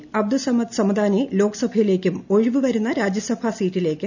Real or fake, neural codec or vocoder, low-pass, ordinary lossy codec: real; none; 7.2 kHz; none